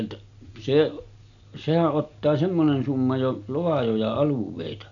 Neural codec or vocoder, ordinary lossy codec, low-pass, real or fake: none; none; 7.2 kHz; real